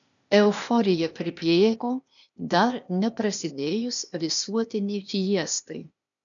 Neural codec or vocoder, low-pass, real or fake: codec, 16 kHz, 0.8 kbps, ZipCodec; 7.2 kHz; fake